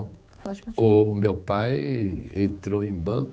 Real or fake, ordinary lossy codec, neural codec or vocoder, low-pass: fake; none; codec, 16 kHz, 4 kbps, X-Codec, HuBERT features, trained on general audio; none